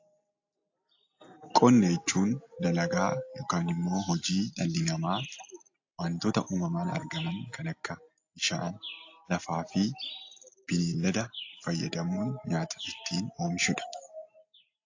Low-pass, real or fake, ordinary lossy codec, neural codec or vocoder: 7.2 kHz; real; AAC, 48 kbps; none